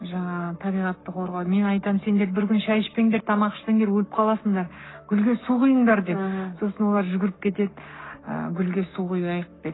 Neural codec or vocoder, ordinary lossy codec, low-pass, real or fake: codec, 44.1 kHz, 7.8 kbps, Pupu-Codec; AAC, 16 kbps; 7.2 kHz; fake